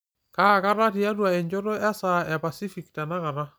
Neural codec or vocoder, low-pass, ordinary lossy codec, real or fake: none; none; none; real